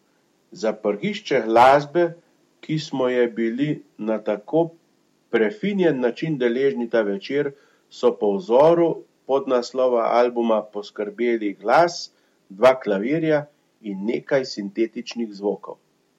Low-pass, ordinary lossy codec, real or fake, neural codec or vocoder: 19.8 kHz; MP3, 64 kbps; real; none